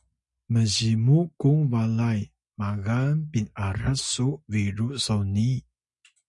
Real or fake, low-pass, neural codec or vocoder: real; 10.8 kHz; none